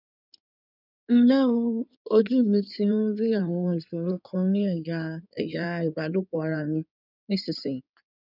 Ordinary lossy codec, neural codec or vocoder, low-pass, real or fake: none; codec, 16 kHz in and 24 kHz out, 2.2 kbps, FireRedTTS-2 codec; 5.4 kHz; fake